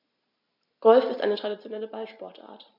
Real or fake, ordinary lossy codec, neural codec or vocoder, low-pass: real; MP3, 48 kbps; none; 5.4 kHz